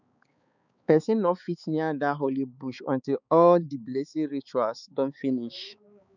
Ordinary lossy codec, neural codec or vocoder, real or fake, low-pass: none; codec, 16 kHz, 4 kbps, X-Codec, HuBERT features, trained on balanced general audio; fake; 7.2 kHz